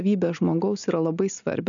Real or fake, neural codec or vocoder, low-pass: real; none; 7.2 kHz